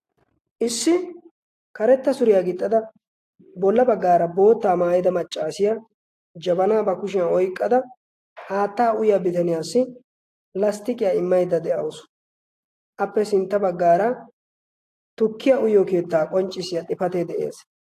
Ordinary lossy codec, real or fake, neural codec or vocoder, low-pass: AAC, 64 kbps; real; none; 14.4 kHz